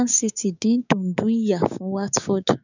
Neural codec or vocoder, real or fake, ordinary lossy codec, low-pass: codec, 16 kHz, 6 kbps, DAC; fake; none; 7.2 kHz